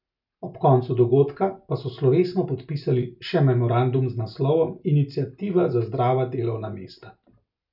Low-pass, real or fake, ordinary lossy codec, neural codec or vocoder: 5.4 kHz; real; none; none